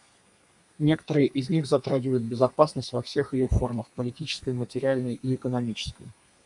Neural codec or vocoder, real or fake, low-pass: codec, 32 kHz, 1.9 kbps, SNAC; fake; 10.8 kHz